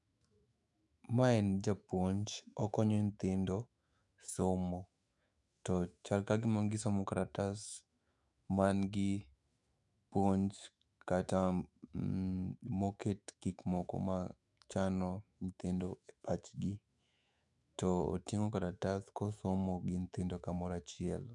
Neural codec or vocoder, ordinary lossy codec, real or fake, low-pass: autoencoder, 48 kHz, 128 numbers a frame, DAC-VAE, trained on Japanese speech; none; fake; 10.8 kHz